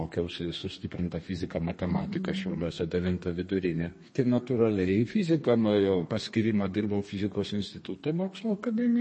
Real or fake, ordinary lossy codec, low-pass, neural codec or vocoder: fake; MP3, 32 kbps; 9.9 kHz; codec, 32 kHz, 1.9 kbps, SNAC